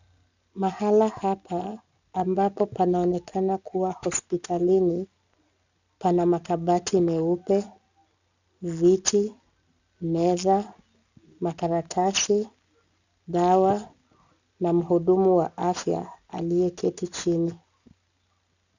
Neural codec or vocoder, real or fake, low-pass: none; real; 7.2 kHz